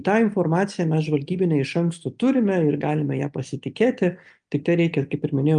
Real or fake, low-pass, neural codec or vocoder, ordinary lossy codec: real; 9.9 kHz; none; Opus, 32 kbps